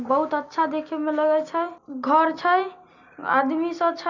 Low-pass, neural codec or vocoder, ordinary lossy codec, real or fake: 7.2 kHz; none; none; real